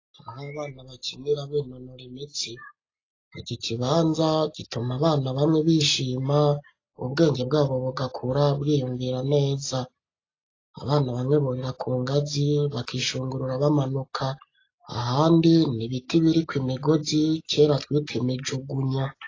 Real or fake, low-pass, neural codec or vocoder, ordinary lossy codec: real; 7.2 kHz; none; AAC, 32 kbps